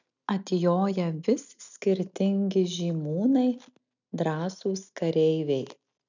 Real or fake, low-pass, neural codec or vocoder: real; 7.2 kHz; none